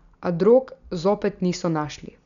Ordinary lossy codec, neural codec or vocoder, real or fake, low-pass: none; none; real; 7.2 kHz